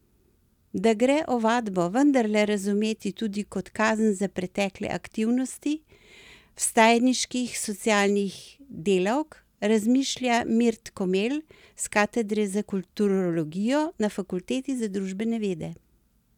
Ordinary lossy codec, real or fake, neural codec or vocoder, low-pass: none; real; none; 19.8 kHz